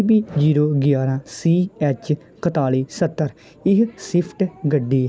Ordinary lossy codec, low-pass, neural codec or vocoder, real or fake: none; none; none; real